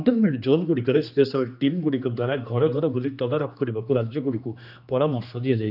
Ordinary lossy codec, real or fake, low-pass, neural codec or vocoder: none; fake; 5.4 kHz; codec, 16 kHz, 2 kbps, X-Codec, HuBERT features, trained on general audio